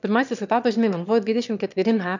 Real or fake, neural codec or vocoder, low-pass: fake; autoencoder, 22.05 kHz, a latent of 192 numbers a frame, VITS, trained on one speaker; 7.2 kHz